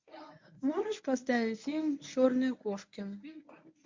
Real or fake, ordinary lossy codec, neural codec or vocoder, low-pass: fake; MP3, 48 kbps; codec, 24 kHz, 0.9 kbps, WavTokenizer, medium speech release version 1; 7.2 kHz